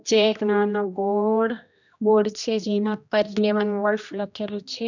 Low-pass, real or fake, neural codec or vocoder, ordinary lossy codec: 7.2 kHz; fake; codec, 16 kHz, 1 kbps, X-Codec, HuBERT features, trained on general audio; none